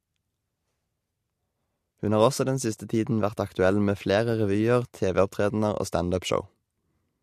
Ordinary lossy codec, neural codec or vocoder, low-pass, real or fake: MP3, 64 kbps; none; 14.4 kHz; real